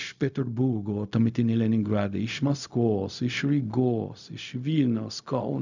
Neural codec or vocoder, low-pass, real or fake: codec, 16 kHz, 0.4 kbps, LongCat-Audio-Codec; 7.2 kHz; fake